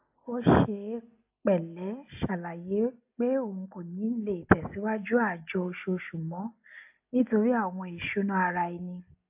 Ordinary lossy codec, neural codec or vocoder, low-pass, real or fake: AAC, 32 kbps; none; 3.6 kHz; real